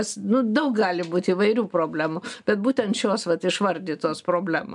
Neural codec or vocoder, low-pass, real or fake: none; 10.8 kHz; real